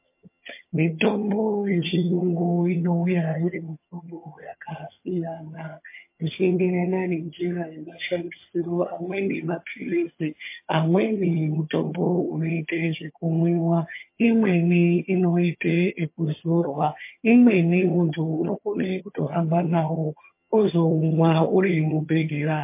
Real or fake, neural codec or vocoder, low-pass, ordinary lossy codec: fake; vocoder, 22.05 kHz, 80 mel bands, HiFi-GAN; 3.6 kHz; MP3, 24 kbps